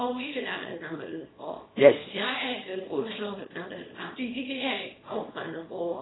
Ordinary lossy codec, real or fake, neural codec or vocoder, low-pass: AAC, 16 kbps; fake; codec, 24 kHz, 0.9 kbps, WavTokenizer, small release; 7.2 kHz